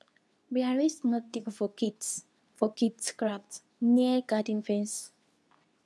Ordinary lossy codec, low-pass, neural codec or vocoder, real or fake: none; none; codec, 24 kHz, 0.9 kbps, WavTokenizer, medium speech release version 2; fake